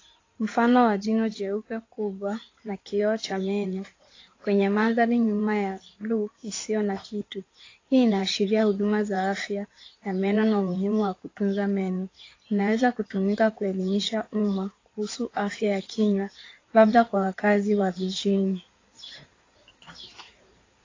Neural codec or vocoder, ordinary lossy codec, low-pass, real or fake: codec, 16 kHz in and 24 kHz out, 2.2 kbps, FireRedTTS-2 codec; AAC, 32 kbps; 7.2 kHz; fake